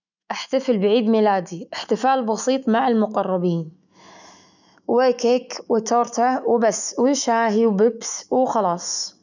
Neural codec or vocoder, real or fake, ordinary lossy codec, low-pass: autoencoder, 48 kHz, 128 numbers a frame, DAC-VAE, trained on Japanese speech; fake; none; 7.2 kHz